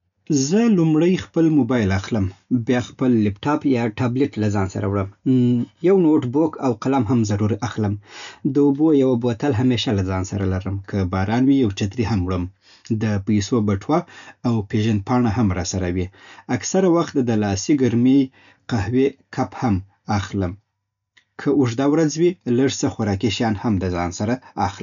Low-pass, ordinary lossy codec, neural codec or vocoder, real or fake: 7.2 kHz; none; none; real